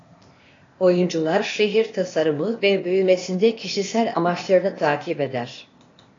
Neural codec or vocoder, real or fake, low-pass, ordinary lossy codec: codec, 16 kHz, 0.8 kbps, ZipCodec; fake; 7.2 kHz; AAC, 32 kbps